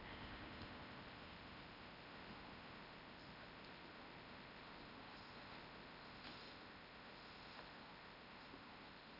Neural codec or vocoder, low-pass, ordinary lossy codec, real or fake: codec, 16 kHz in and 24 kHz out, 0.8 kbps, FocalCodec, streaming, 65536 codes; 5.4 kHz; none; fake